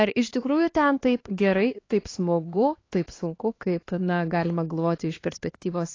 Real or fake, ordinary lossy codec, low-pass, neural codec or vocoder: fake; AAC, 32 kbps; 7.2 kHz; codec, 16 kHz, 2 kbps, X-Codec, HuBERT features, trained on LibriSpeech